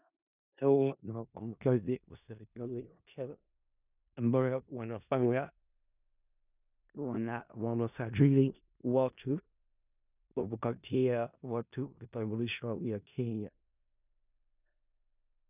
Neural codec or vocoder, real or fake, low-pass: codec, 16 kHz in and 24 kHz out, 0.4 kbps, LongCat-Audio-Codec, four codebook decoder; fake; 3.6 kHz